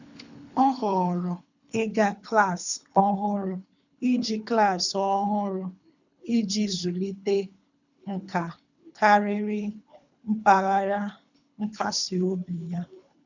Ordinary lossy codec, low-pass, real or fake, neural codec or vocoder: none; 7.2 kHz; fake; codec, 24 kHz, 3 kbps, HILCodec